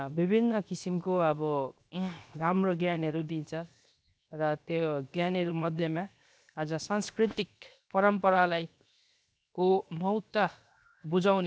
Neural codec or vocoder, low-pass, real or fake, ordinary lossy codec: codec, 16 kHz, 0.7 kbps, FocalCodec; none; fake; none